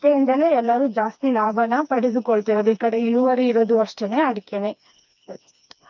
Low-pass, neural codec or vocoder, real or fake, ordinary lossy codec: 7.2 kHz; codec, 16 kHz, 2 kbps, FreqCodec, smaller model; fake; none